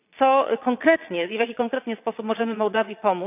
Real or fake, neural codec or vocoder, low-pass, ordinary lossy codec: fake; vocoder, 22.05 kHz, 80 mel bands, Vocos; 3.6 kHz; none